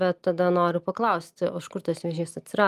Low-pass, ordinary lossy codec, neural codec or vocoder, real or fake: 14.4 kHz; Opus, 32 kbps; none; real